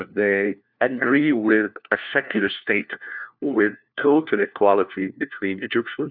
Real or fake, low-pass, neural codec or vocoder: fake; 5.4 kHz; codec, 16 kHz, 1 kbps, FunCodec, trained on LibriTTS, 50 frames a second